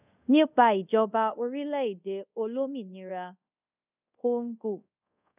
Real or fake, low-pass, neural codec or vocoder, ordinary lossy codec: fake; 3.6 kHz; codec, 24 kHz, 0.5 kbps, DualCodec; none